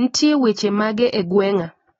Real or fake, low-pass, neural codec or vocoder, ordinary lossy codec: real; 9.9 kHz; none; AAC, 24 kbps